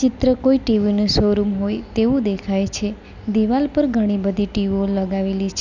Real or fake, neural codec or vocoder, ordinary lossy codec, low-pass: real; none; none; 7.2 kHz